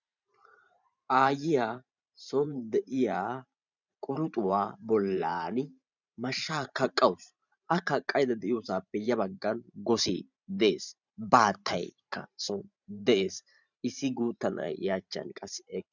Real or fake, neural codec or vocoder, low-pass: fake; vocoder, 44.1 kHz, 128 mel bands every 512 samples, BigVGAN v2; 7.2 kHz